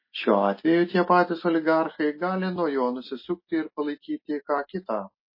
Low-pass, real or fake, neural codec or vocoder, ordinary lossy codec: 5.4 kHz; real; none; MP3, 24 kbps